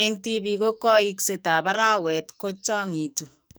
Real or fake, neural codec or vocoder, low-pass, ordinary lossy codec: fake; codec, 44.1 kHz, 2.6 kbps, SNAC; none; none